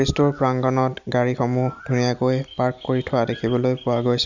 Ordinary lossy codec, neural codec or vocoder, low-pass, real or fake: none; none; 7.2 kHz; real